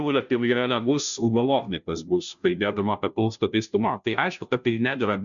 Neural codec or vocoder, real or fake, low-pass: codec, 16 kHz, 0.5 kbps, FunCodec, trained on Chinese and English, 25 frames a second; fake; 7.2 kHz